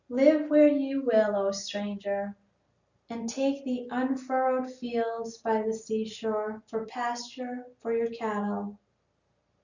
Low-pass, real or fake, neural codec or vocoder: 7.2 kHz; real; none